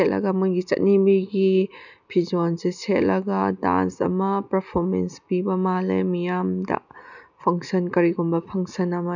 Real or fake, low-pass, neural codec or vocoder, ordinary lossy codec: real; 7.2 kHz; none; none